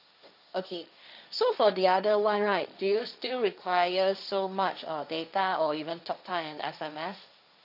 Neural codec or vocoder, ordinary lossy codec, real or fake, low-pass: codec, 16 kHz, 1.1 kbps, Voila-Tokenizer; none; fake; 5.4 kHz